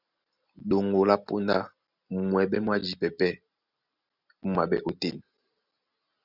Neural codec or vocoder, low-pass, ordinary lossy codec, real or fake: none; 5.4 kHz; Opus, 64 kbps; real